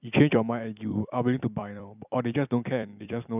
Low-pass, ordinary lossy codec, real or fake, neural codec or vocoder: 3.6 kHz; none; fake; vocoder, 44.1 kHz, 128 mel bands every 256 samples, BigVGAN v2